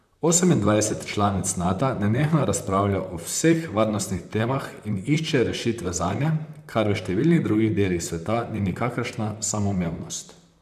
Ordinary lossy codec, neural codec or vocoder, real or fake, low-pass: MP3, 96 kbps; vocoder, 44.1 kHz, 128 mel bands, Pupu-Vocoder; fake; 14.4 kHz